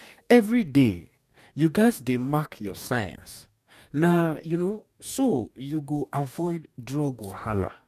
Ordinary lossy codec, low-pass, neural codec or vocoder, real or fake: none; 14.4 kHz; codec, 44.1 kHz, 2.6 kbps, DAC; fake